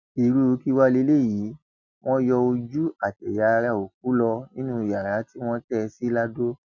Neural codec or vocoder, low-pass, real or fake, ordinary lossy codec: none; 7.2 kHz; real; none